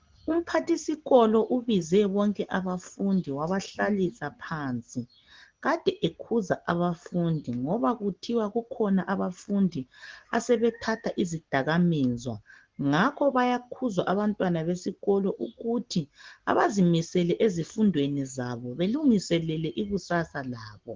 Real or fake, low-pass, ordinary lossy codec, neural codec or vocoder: real; 7.2 kHz; Opus, 16 kbps; none